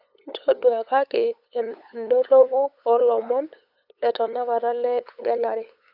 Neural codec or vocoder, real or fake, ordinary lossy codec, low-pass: codec, 16 kHz, 8 kbps, FunCodec, trained on LibriTTS, 25 frames a second; fake; none; 5.4 kHz